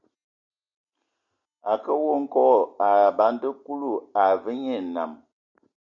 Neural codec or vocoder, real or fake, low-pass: none; real; 7.2 kHz